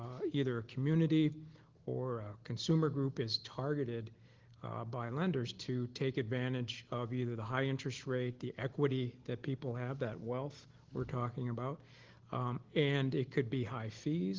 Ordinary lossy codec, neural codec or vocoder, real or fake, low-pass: Opus, 16 kbps; none; real; 7.2 kHz